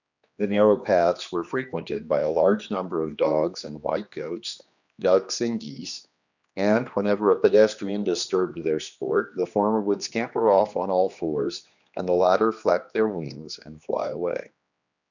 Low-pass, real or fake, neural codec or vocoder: 7.2 kHz; fake; codec, 16 kHz, 2 kbps, X-Codec, HuBERT features, trained on general audio